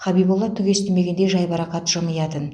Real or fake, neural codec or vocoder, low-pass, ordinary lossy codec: real; none; 9.9 kHz; none